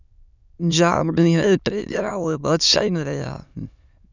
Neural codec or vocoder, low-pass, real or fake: autoencoder, 22.05 kHz, a latent of 192 numbers a frame, VITS, trained on many speakers; 7.2 kHz; fake